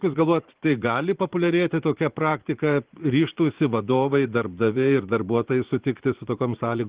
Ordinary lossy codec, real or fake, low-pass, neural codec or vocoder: Opus, 16 kbps; fake; 3.6 kHz; vocoder, 44.1 kHz, 80 mel bands, Vocos